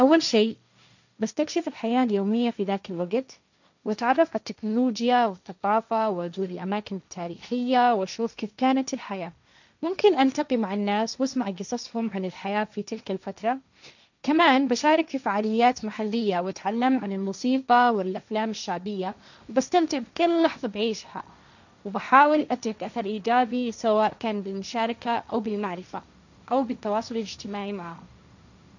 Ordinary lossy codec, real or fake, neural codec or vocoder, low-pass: none; fake; codec, 16 kHz, 1.1 kbps, Voila-Tokenizer; 7.2 kHz